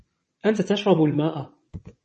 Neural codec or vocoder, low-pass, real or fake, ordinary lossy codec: vocoder, 22.05 kHz, 80 mel bands, WaveNeXt; 9.9 kHz; fake; MP3, 32 kbps